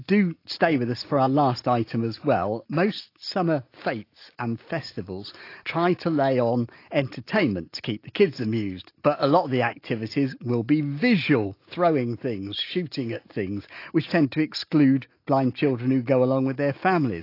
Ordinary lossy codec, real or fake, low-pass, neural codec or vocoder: AAC, 32 kbps; real; 5.4 kHz; none